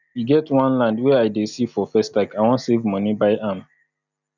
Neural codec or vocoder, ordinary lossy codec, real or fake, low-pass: none; none; real; 7.2 kHz